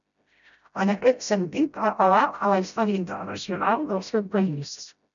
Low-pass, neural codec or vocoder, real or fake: 7.2 kHz; codec, 16 kHz, 0.5 kbps, FreqCodec, smaller model; fake